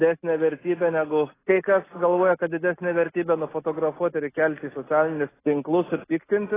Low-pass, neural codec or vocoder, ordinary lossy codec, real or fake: 3.6 kHz; none; AAC, 16 kbps; real